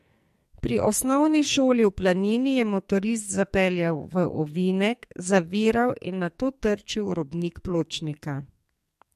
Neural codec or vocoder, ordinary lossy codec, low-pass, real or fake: codec, 44.1 kHz, 2.6 kbps, SNAC; MP3, 64 kbps; 14.4 kHz; fake